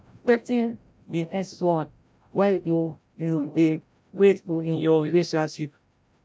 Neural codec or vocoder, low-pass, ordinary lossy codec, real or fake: codec, 16 kHz, 0.5 kbps, FreqCodec, larger model; none; none; fake